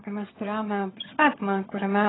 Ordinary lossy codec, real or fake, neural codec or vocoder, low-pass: AAC, 16 kbps; fake; vocoder, 22.05 kHz, 80 mel bands, HiFi-GAN; 7.2 kHz